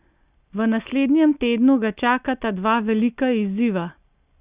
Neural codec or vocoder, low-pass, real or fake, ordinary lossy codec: none; 3.6 kHz; real; Opus, 64 kbps